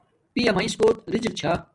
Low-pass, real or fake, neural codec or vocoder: 10.8 kHz; real; none